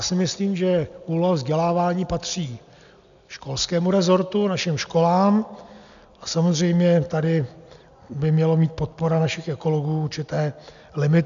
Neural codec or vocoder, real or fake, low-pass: none; real; 7.2 kHz